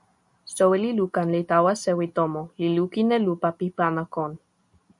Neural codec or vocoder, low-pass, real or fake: none; 10.8 kHz; real